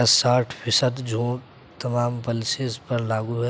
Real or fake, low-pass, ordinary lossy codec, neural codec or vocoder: real; none; none; none